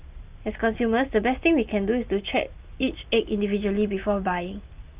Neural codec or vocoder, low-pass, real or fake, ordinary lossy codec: none; 3.6 kHz; real; Opus, 32 kbps